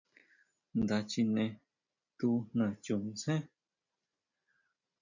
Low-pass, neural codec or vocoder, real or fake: 7.2 kHz; none; real